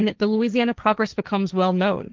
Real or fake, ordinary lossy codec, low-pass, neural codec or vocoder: fake; Opus, 24 kbps; 7.2 kHz; codec, 16 kHz, 1.1 kbps, Voila-Tokenizer